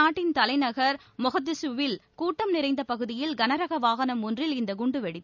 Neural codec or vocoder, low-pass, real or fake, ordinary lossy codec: none; 7.2 kHz; real; none